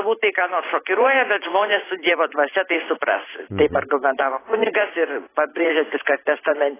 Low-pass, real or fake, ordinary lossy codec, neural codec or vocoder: 3.6 kHz; fake; AAC, 16 kbps; vocoder, 44.1 kHz, 128 mel bands every 512 samples, BigVGAN v2